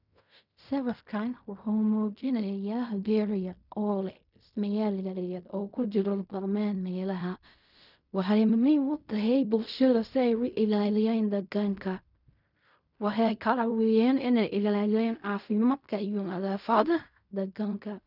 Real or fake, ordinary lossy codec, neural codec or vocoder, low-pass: fake; none; codec, 16 kHz in and 24 kHz out, 0.4 kbps, LongCat-Audio-Codec, fine tuned four codebook decoder; 5.4 kHz